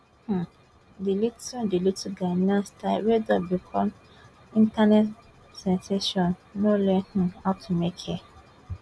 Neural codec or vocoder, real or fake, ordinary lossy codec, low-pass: none; real; none; none